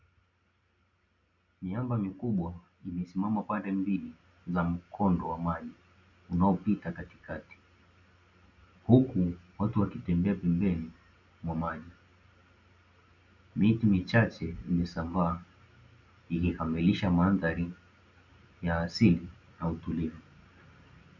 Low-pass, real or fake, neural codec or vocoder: 7.2 kHz; real; none